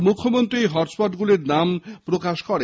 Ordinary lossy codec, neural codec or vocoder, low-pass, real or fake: none; none; none; real